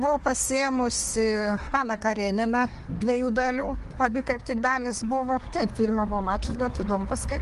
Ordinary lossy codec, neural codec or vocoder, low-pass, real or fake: Opus, 24 kbps; codec, 24 kHz, 1 kbps, SNAC; 10.8 kHz; fake